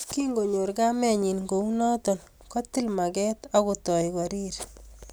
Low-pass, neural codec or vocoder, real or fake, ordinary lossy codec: none; none; real; none